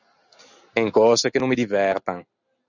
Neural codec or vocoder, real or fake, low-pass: none; real; 7.2 kHz